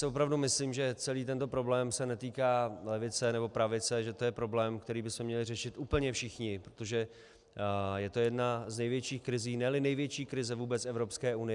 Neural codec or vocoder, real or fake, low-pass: none; real; 10.8 kHz